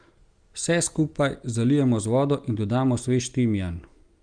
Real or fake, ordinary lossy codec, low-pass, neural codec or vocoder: real; Opus, 32 kbps; 9.9 kHz; none